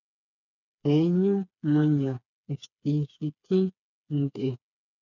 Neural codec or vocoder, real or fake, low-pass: codec, 16 kHz, 4 kbps, FreqCodec, smaller model; fake; 7.2 kHz